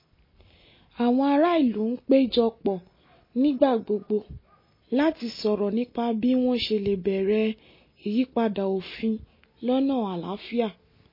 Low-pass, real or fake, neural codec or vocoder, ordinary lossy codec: 5.4 kHz; real; none; MP3, 24 kbps